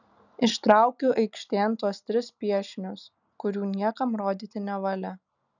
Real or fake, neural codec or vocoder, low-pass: real; none; 7.2 kHz